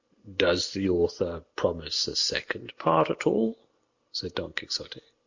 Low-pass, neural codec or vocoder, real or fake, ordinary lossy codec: 7.2 kHz; none; real; Opus, 64 kbps